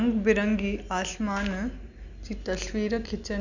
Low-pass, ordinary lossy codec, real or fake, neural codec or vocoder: 7.2 kHz; none; real; none